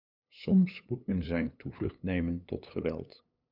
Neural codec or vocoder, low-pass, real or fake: codec, 16 kHz, 8 kbps, FunCodec, trained on LibriTTS, 25 frames a second; 5.4 kHz; fake